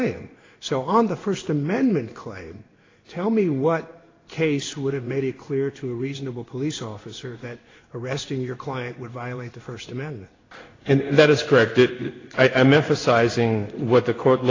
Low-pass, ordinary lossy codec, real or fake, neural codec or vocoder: 7.2 kHz; AAC, 32 kbps; fake; codec, 16 kHz in and 24 kHz out, 1 kbps, XY-Tokenizer